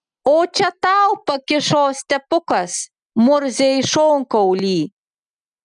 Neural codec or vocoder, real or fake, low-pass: none; real; 9.9 kHz